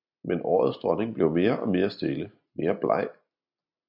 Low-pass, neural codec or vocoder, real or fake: 5.4 kHz; none; real